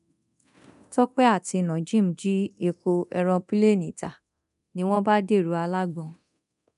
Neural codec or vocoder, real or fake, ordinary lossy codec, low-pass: codec, 24 kHz, 0.9 kbps, DualCodec; fake; none; 10.8 kHz